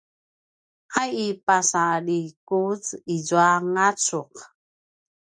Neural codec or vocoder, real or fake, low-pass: none; real; 9.9 kHz